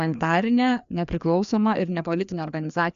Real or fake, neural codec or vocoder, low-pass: fake; codec, 16 kHz, 2 kbps, FreqCodec, larger model; 7.2 kHz